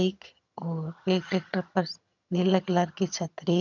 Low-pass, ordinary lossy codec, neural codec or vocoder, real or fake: 7.2 kHz; none; vocoder, 22.05 kHz, 80 mel bands, HiFi-GAN; fake